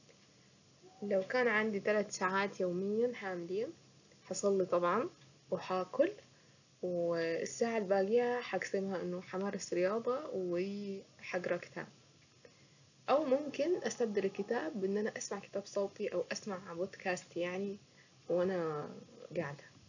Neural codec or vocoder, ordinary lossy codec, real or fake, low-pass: none; none; real; 7.2 kHz